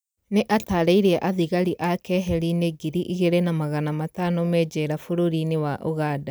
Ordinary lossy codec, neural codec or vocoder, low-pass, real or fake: none; none; none; real